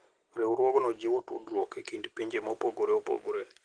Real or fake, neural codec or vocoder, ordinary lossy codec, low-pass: real; none; Opus, 16 kbps; 9.9 kHz